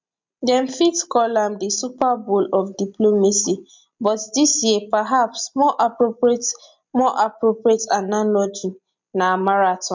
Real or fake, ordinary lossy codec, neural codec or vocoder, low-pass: real; MP3, 64 kbps; none; 7.2 kHz